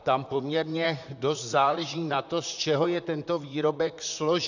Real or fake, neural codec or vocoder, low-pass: fake; vocoder, 44.1 kHz, 128 mel bands, Pupu-Vocoder; 7.2 kHz